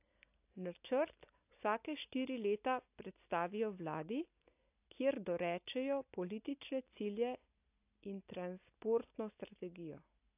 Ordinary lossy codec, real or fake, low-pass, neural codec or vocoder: AAC, 32 kbps; real; 3.6 kHz; none